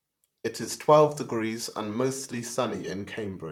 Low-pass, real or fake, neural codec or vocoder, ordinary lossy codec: 19.8 kHz; fake; vocoder, 44.1 kHz, 128 mel bands, Pupu-Vocoder; MP3, 96 kbps